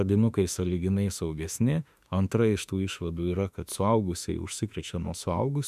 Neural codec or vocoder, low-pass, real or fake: autoencoder, 48 kHz, 32 numbers a frame, DAC-VAE, trained on Japanese speech; 14.4 kHz; fake